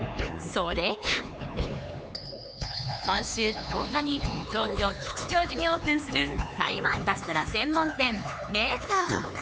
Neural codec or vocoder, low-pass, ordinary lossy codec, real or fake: codec, 16 kHz, 4 kbps, X-Codec, HuBERT features, trained on LibriSpeech; none; none; fake